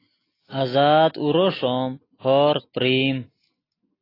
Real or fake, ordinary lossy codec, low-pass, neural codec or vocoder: real; AAC, 24 kbps; 5.4 kHz; none